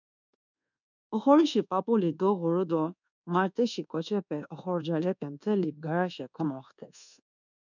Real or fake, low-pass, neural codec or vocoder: fake; 7.2 kHz; codec, 24 kHz, 1.2 kbps, DualCodec